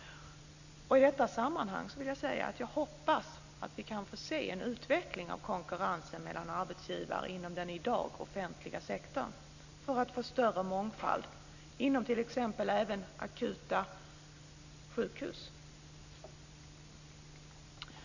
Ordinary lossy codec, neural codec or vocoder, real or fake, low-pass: none; none; real; 7.2 kHz